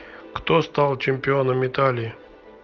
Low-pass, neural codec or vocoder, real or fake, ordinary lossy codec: 7.2 kHz; none; real; Opus, 24 kbps